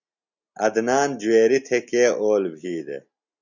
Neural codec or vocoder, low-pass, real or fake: none; 7.2 kHz; real